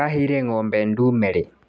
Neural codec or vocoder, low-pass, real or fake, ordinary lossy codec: none; none; real; none